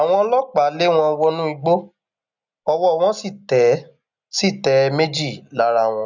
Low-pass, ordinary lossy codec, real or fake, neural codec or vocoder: 7.2 kHz; none; real; none